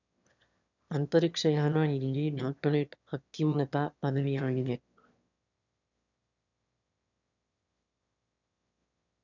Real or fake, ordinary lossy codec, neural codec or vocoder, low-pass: fake; none; autoencoder, 22.05 kHz, a latent of 192 numbers a frame, VITS, trained on one speaker; 7.2 kHz